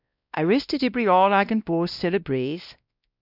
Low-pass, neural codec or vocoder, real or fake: 5.4 kHz; codec, 16 kHz, 1 kbps, X-Codec, WavLM features, trained on Multilingual LibriSpeech; fake